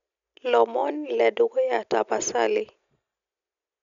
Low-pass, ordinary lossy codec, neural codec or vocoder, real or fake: 7.2 kHz; MP3, 96 kbps; none; real